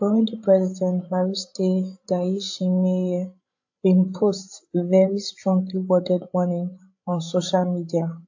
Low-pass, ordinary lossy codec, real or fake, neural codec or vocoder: 7.2 kHz; AAC, 48 kbps; fake; codec, 16 kHz, 16 kbps, FreqCodec, larger model